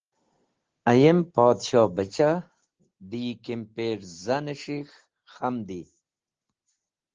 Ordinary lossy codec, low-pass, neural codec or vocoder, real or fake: Opus, 16 kbps; 7.2 kHz; none; real